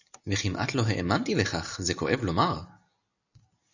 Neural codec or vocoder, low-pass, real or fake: none; 7.2 kHz; real